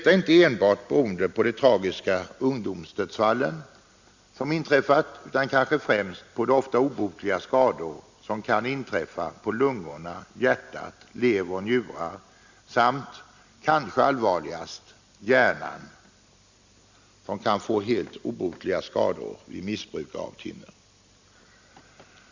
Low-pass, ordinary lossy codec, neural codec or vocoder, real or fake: 7.2 kHz; Opus, 64 kbps; none; real